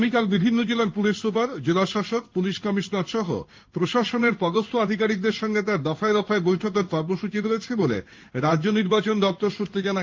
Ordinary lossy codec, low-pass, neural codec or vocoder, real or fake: Opus, 32 kbps; 7.2 kHz; codec, 16 kHz in and 24 kHz out, 1 kbps, XY-Tokenizer; fake